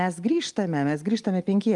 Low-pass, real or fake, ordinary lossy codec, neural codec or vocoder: 10.8 kHz; real; Opus, 32 kbps; none